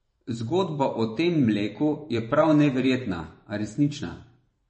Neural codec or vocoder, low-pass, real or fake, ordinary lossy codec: vocoder, 44.1 kHz, 128 mel bands every 512 samples, BigVGAN v2; 10.8 kHz; fake; MP3, 32 kbps